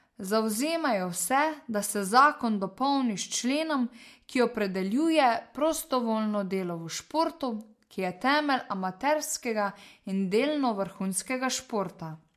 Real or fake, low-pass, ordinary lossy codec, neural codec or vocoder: real; 14.4 kHz; MP3, 64 kbps; none